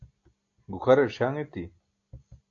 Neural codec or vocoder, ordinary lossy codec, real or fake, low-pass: none; AAC, 48 kbps; real; 7.2 kHz